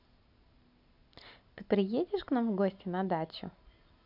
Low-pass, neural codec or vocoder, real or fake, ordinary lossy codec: 5.4 kHz; none; real; none